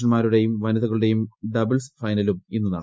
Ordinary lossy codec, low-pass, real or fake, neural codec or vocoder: none; none; real; none